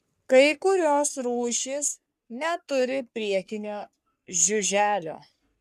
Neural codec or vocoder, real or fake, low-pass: codec, 44.1 kHz, 3.4 kbps, Pupu-Codec; fake; 14.4 kHz